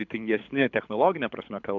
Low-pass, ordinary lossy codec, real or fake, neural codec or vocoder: 7.2 kHz; MP3, 64 kbps; fake; codec, 24 kHz, 6 kbps, HILCodec